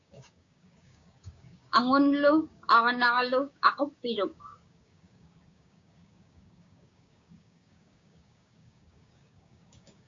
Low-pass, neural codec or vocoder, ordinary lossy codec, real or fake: 7.2 kHz; codec, 16 kHz, 6 kbps, DAC; AAC, 48 kbps; fake